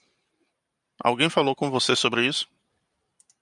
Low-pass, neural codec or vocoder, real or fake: 10.8 kHz; vocoder, 44.1 kHz, 128 mel bands every 512 samples, BigVGAN v2; fake